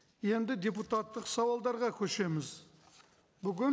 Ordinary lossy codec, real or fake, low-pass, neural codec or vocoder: none; real; none; none